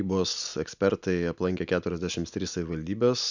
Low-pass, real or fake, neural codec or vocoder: 7.2 kHz; real; none